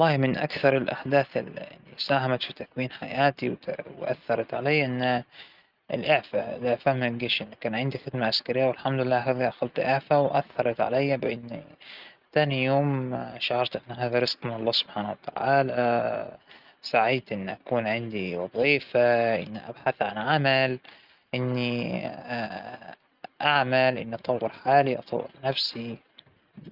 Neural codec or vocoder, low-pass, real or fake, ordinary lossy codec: none; 5.4 kHz; real; Opus, 24 kbps